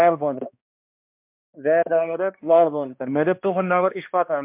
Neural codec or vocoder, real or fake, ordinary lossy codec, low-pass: codec, 16 kHz, 1 kbps, X-Codec, HuBERT features, trained on balanced general audio; fake; MP3, 32 kbps; 3.6 kHz